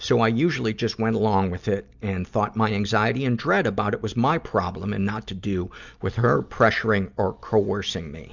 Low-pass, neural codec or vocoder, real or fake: 7.2 kHz; none; real